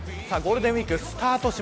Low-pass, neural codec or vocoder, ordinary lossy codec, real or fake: none; none; none; real